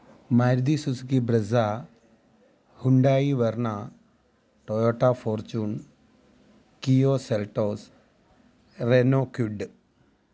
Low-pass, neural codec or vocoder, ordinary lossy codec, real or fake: none; none; none; real